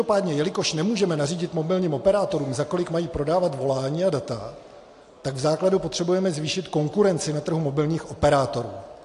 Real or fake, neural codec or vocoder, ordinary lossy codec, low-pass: real; none; AAC, 48 kbps; 10.8 kHz